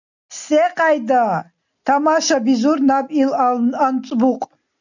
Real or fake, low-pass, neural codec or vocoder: real; 7.2 kHz; none